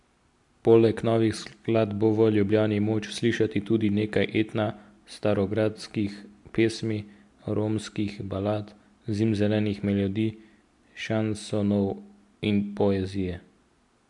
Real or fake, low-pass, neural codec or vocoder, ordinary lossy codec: real; 10.8 kHz; none; MP3, 64 kbps